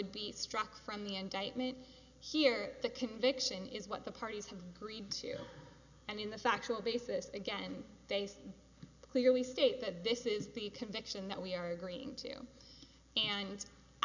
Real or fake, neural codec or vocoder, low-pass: real; none; 7.2 kHz